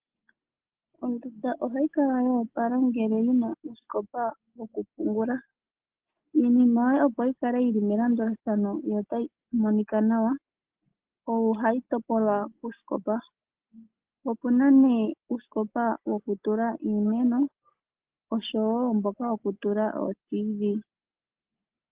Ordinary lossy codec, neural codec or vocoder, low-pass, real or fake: Opus, 16 kbps; none; 3.6 kHz; real